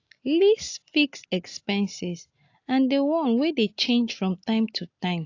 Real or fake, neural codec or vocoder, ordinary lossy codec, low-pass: real; none; AAC, 48 kbps; 7.2 kHz